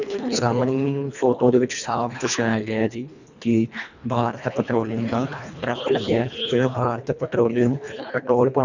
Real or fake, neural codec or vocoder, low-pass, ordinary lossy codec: fake; codec, 24 kHz, 1.5 kbps, HILCodec; 7.2 kHz; none